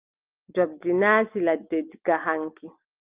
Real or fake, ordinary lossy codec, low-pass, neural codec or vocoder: real; Opus, 32 kbps; 3.6 kHz; none